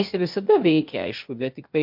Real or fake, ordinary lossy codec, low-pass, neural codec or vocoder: fake; MP3, 48 kbps; 5.4 kHz; codec, 16 kHz, 0.8 kbps, ZipCodec